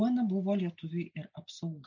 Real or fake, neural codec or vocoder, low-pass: real; none; 7.2 kHz